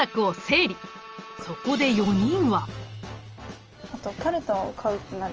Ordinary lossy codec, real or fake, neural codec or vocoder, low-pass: Opus, 24 kbps; real; none; 7.2 kHz